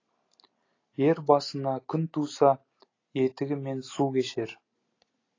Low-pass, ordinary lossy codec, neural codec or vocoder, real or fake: 7.2 kHz; AAC, 32 kbps; none; real